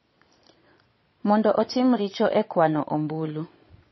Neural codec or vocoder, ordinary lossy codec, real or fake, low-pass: vocoder, 22.05 kHz, 80 mel bands, Vocos; MP3, 24 kbps; fake; 7.2 kHz